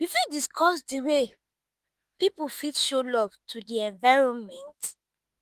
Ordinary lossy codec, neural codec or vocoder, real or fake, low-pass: Opus, 24 kbps; autoencoder, 48 kHz, 32 numbers a frame, DAC-VAE, trained on Japanese speech; fake; 14.4 kHz